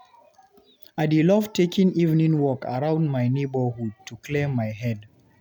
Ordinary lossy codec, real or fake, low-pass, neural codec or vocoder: none; real; 19.8 kHz; none